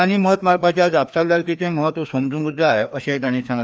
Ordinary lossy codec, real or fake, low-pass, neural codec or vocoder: none; fake; none; codec, 16 kHz, 2 kbps, FreqCodec, larger model